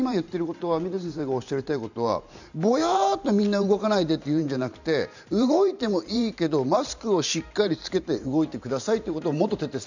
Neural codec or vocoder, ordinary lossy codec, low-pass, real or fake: vocoder, 44.1 kHz, 128 mel bands every 512 samples, BigVGAN v2; none; 7.2 kHz; fake